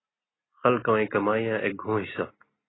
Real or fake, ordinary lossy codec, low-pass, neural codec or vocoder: real; AAC, 16 kbps; 7.2 kHz; none